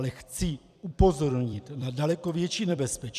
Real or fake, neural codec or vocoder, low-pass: fake; vocoder, 44.1 kHz, 128 mel bands every 256 samples, BigVGAN v2; 14.4 kHz